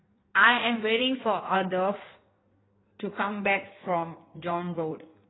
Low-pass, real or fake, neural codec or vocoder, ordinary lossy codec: 7.2 kHz; fake; codec, 16 kHz in and 24 kHz out, 1.1 kbps, FireRedTTS-2 codec; AAC, 16 kbps